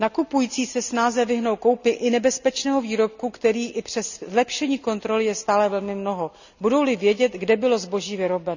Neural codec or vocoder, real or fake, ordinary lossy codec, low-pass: none; real; none; 7.2 kHz